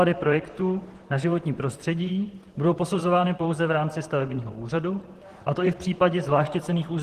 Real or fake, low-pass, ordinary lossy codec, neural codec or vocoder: fake; 14.4 kHz; Opus, 16 kbps; vocoder, 44.1 kHz, 128 mel bands, Pupu-Vocoder